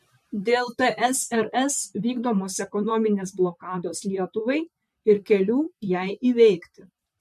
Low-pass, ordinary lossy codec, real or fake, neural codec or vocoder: 14.4 kHz; MP3, 64 kbps; fake; vocoder, 44.1 kHz, 128 mel bands, Pupu-Vocoder